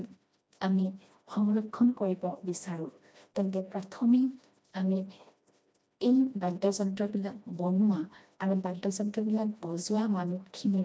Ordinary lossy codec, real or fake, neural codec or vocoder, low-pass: none; fake; codec, 16 kHz, 1 kbps, FreqCodec, smaller model; none